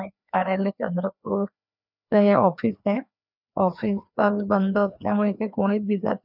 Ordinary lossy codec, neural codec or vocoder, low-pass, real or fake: none; codec, 16 kHz, 2 kbps, FreqCodec, larger model; 5.4 kHz; fake